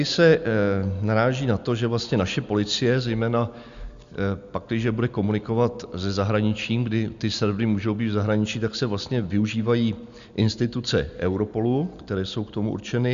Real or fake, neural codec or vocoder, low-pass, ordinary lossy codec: real; none; 7.2 kHz; Opus, 64 kbps